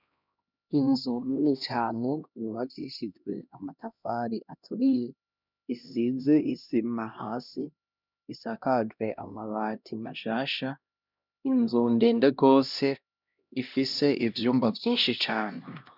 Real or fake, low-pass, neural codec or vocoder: fake; 5.4 kHz; codec, 16 kHz, 1 kbps, X-Codec, HuBERT features, trained on LibriSpeech